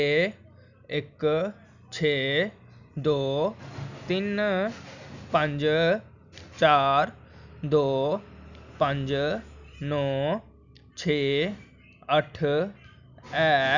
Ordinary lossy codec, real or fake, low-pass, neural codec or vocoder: none; real; 7.2 kHz; none